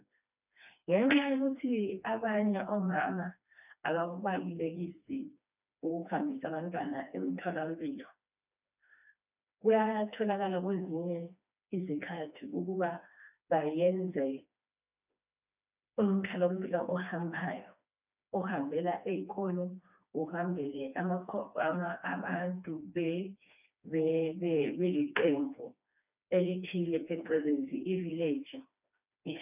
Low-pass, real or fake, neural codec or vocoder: 3.6 kHz; fake; codec, 16 kHz, 2 kbps, FreqCodec, smaller model